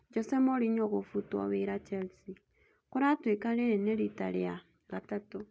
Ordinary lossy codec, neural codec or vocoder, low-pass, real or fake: none; none; none; real